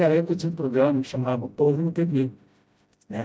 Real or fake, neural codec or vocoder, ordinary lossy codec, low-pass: fake; codec, 16 kHz, 0.5 kbps, FreqCodec, smaller model; none; none